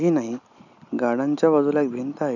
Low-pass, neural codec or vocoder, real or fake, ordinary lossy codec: 7.2 kHz; none; real; none